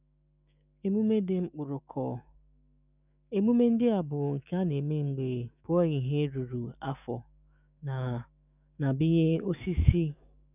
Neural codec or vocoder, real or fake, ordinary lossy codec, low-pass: autoencoder, 48 kHz, 128 numbers a frame, DAC-VAE, trained on Japanese speech; fake; none; 3.6 kHz